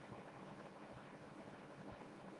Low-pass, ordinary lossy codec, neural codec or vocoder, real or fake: 10.8 kHz; Opus, 32 kbps; codec, 24 kHz, 0.9 kbps, WavTokenizer, small release; fake